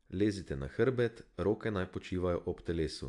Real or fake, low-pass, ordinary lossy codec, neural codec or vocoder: real; 10.8 kHz; AAC, 64 kbps; none